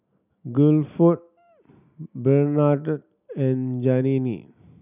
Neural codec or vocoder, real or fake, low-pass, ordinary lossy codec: none; real; 3.6 kHz; none